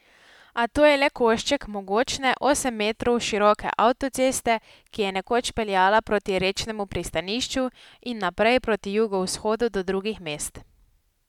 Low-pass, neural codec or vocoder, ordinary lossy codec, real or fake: 19.8 kHz; none; none; real